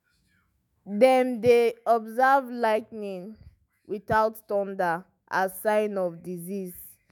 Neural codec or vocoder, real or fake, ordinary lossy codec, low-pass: autoencoder, 48 kHz, 128 numbers a frame, DAC-VAE, trained on Japanese speech; fake; none; none